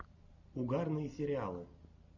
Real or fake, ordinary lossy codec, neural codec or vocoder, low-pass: real; MP3, 64 kbps; none; 7.2 kHz